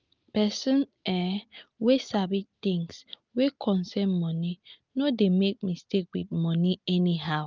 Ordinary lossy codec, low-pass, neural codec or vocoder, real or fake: Opus, 24 kbps; 7.2 kHz; none; real